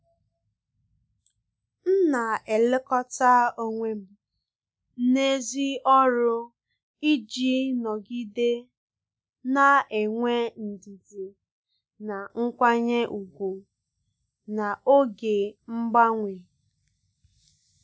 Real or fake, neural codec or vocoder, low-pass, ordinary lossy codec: real; none; none; none